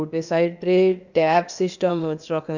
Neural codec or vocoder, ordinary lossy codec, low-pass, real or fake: codec, 16 kHz, 0.8 kbps, ZipCodec; none; 7.2 kHz; fake